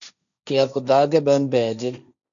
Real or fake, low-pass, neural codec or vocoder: fake; 7.2 kHz; codec, 16 kHz, 1.1 kbps, Voila-Tokenizer